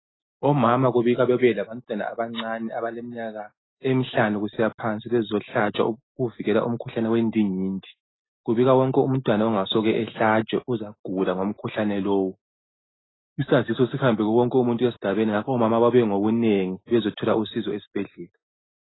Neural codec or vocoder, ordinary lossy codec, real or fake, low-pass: none; AAC, 16 kbps; real; 7.2 kHz